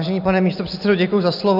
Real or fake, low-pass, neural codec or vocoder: real; 5.4 kHz; none